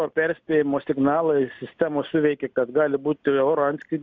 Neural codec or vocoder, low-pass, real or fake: none; 7.2 kHz; real